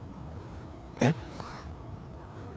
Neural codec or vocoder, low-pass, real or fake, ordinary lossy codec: codec, 16 kHz, 2 kbps, FreqCodec, larger model; none; fake; none